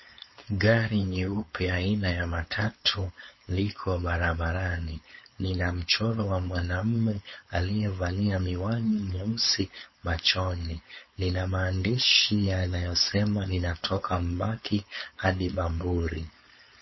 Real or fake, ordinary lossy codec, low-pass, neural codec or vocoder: fake; MP3, 24 kbps; 7.2 kHz; codec, 16 kHz, 4.8 kbps, FACodec